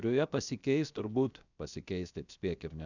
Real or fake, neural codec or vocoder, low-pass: fake; codec, 16 kHz, 0.7 kbps, FocalCodec; 7.2 kHz